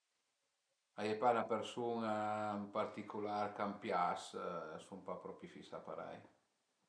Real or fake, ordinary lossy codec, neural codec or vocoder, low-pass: real; none; none; 9.9 kHz